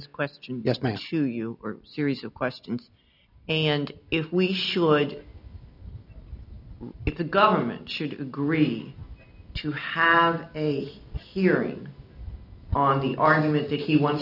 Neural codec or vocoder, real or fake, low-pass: none; real; 5.4 kHz